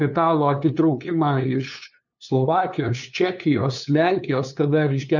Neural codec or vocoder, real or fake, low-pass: codec, 16 kHz, 2 kbps, FunCodec, trained on LibriTTS, 25 frames a second; fake; 7.2 kHz